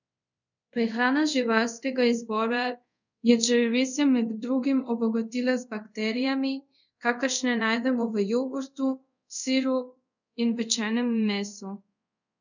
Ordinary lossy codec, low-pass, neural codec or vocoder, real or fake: none; 7.2 kHz; codec, 24 kHz, 0.5 kbps, DualCodec; fake